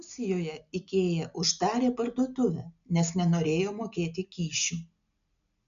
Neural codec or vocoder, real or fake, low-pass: none; real; 7.2 kHz